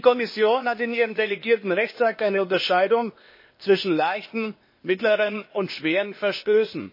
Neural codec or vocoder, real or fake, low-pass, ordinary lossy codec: codec, 16 kHz, 0.8 kbps, ZipCodec; fake; 5.4 kHz; MP3, 24 kbps